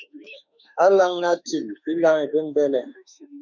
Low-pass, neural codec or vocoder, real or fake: 7.2 kHz; autoencoder, 48 kHz, 32 numbers a frame, DAC-VAE, trained on Japanese speech; fake